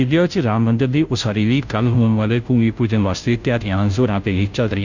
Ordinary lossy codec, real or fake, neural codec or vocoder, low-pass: none; fake; codec, 16 kHz, 0.5 kbps, FunCodec, trained on Chinese and English, 25 frames a second; 7.2 kHz